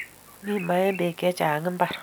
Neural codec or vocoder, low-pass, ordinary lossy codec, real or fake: none; none; none; real